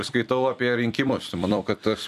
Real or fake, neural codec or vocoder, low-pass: fake; vocoder, 44.1 kHz, 128 mel bands every 256 samples, BigVGAN v2; 14.4 kHz